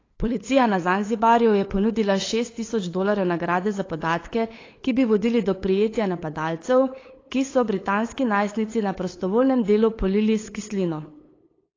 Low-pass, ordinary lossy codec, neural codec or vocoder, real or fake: 7.2 kHz; AAC, 32 kbps; codec, 16 kHz, 8 kbps, FunCodec, trained on LibriTTS, 25 frames a second; fake